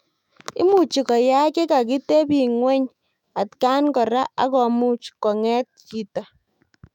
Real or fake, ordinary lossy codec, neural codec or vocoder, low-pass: fake; none; autoencoder, 48 kHz, 128 numbers a frame, DAC-VAE, trained on Japanese speech; 19.8 kHz